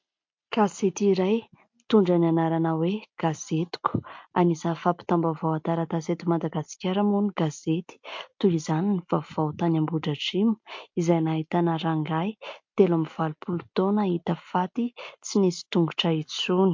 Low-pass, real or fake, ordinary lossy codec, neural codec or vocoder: 7.2 kHz; real; MP3, 48 kbps; none